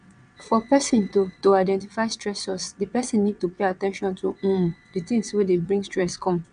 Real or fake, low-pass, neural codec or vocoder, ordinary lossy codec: fake; 9.9 kHz; vocoder, 22.05 kHz, 80 mel bands, WaveNeXt; none